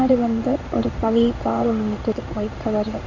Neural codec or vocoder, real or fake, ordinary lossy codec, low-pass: codec, 16 kHz in and 24 kHz out, 1 kbps, XY-Tokenizer; fake; MP3, 48 kbps; 7.2 kHz